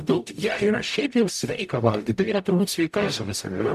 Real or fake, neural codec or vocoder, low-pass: fake; codec, 44.1 kHz, 0.9 kbps, DAC; 14.4 kHz